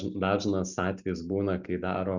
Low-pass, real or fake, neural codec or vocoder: 7.2 kHz; real; none